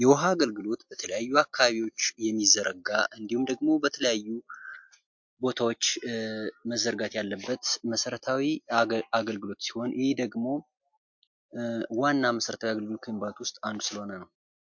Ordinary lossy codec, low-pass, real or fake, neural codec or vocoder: MP3, 48 kbps; 7.2 kHz; real; none